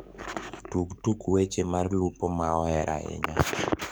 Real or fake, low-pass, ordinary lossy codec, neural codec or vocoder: fake; none; none; codec, 44.1 kHz, 7.8 kbps, DAC